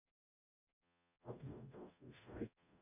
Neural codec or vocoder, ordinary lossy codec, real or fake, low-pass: codec, 44.1 kHz, 0.9 kbps, DAC; none; fake; 3.6 kHz